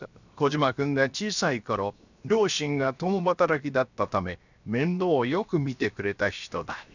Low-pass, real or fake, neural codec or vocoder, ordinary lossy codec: 7.2 kHz; fake; codec, 16 kHz, 0.7 kbps, FocalCodec; none